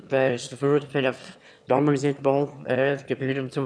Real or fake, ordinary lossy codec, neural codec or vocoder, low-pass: fake; none; autoencoder, 22.05 kHz, a latent of 192 numbers a frame, VITS, trained on one speaker; none